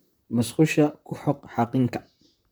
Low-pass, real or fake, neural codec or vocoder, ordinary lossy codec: none; fake; vocoder, 44.1 kHz, 128 mel bands, Pupu-Vocoder; none